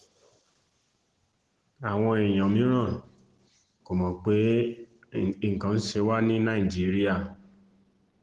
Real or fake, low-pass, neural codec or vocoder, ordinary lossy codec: real; 10.8 kHz; none; Opus, 16 kbps